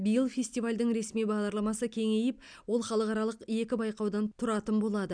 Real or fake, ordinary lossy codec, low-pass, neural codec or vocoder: real; none; 9.9 kHz; none